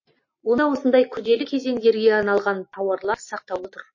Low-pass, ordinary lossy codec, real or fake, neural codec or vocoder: 7.2 kHz; MP3, 32 kbps; real; none